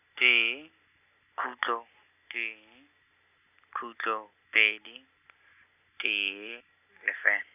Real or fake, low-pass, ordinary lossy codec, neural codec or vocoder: real; 3.6 kHz; none; none